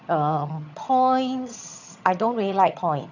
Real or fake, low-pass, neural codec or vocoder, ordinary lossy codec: fake; 7.2 kHz; vocoder, 22.05 kHz, 80 mel bands, HiFi-GAN; none